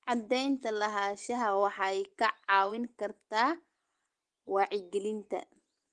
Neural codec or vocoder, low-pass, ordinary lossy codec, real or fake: vocoder, 24 kHz, 100 mel bands, Vocos; 10.8 kHz; Opus, 24 kbps; fake